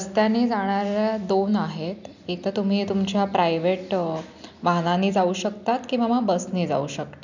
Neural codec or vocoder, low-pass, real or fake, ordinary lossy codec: none; 7.2 kHz; real; none